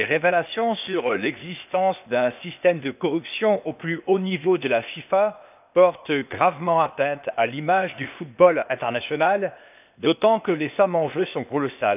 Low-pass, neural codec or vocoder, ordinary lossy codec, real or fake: 3.6 kHz; codec, 16 kHz, 0.8 kbps, ZipCodec; none; fake